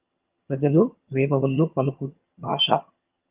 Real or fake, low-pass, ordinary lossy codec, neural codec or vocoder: fake; 3.6 kHz; Opus, 32 kbps; vocoder, 22.05 kHz, 80 mel bands, HiFi-GAN